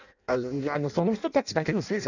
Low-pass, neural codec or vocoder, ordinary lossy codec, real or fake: 7.2 kHz; codec, 16 kHz in and 24 kHz out, 0.6 kbps, FireRedTTS-2 codec; none; fake